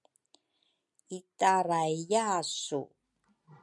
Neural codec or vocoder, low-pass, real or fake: none; 10.8 kHz; real